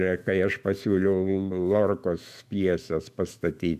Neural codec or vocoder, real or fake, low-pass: autoencoder, 48 kHz, 128 numbers a frame, DAC-VAE, trained on Japanese speech; fake; 14.4 kHz